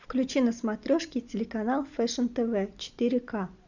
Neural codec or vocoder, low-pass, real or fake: none; 7.2 kHz; real